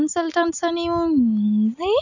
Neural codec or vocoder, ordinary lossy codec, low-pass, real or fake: none; none; 7.2 kHz; real